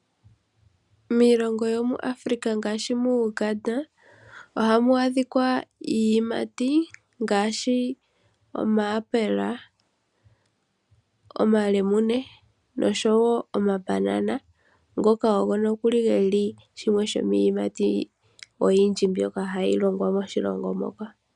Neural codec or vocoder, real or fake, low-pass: none; real; 10.8 kHz